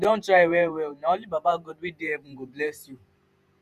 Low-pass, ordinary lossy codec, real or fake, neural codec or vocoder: 14.4 kHz; none; fake; vocoder, 48 kHz, 128 mel bands, Vocos